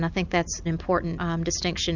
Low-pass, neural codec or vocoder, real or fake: 7.2 kHz; none; real